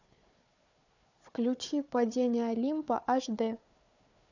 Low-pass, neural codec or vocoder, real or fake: 7.2 kHz; codec, 16 kHz, 4 kbps, FunCodec, trained on Chinese and English, 50 frames a second; fake